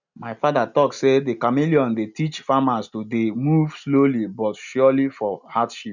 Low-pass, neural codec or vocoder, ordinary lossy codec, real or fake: 7.2 kHz; none; none; real